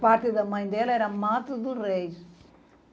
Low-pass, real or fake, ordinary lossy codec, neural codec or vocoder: none; real; none; none